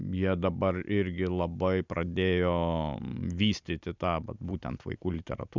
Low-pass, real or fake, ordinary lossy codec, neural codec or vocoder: 7.2 kHz; real; Opus, 64 kbps; none